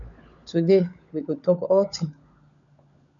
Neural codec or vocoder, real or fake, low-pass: codec, 16 kHz, 16 kbps, FunCodec, trained on LibriTTS, 50 frames a second; fake; 7.2 kHz